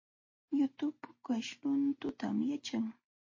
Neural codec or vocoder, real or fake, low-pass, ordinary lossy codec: none; real; 7.2 kHz; MP3, 32 kbps